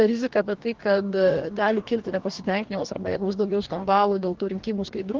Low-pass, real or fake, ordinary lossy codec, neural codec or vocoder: 7.2 kHz; fake; Opus, 16 kbps; codec, 44.1 kHz, 2.6 kbps, DAC